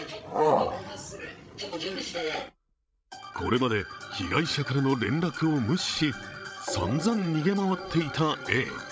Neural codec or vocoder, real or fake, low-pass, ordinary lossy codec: codec, 16 kHz, 16 kbps, FreqCodec, larger model; fake; none; none